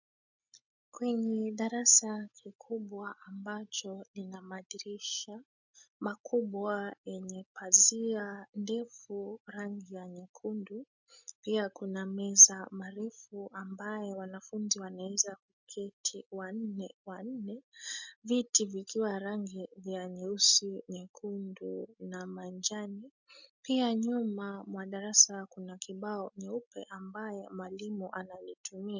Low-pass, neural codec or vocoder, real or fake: 7.2 kHz; none; real